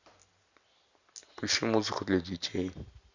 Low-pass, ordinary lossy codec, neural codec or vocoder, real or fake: 7.2 kHz; Opus, 64 kbps; none; real